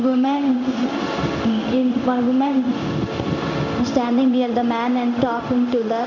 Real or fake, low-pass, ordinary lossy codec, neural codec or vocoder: fake; 7.2 kHz; none; codec, 16 kHz in and 24 kHz out, 1 kbps, XY-Tokenizer